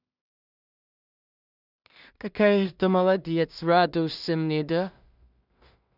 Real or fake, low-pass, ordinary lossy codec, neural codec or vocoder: fake; 5.4 kHz; none; codec, 16 kHz in and 24 kHz out, 0.4 kbps, LongCat-Audio-Codec, two codebook decoder